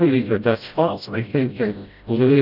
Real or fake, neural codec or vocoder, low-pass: fake; codec, 16 kHz, 0.5 kbps, FreqCodec, smaller model; 5.4 kHz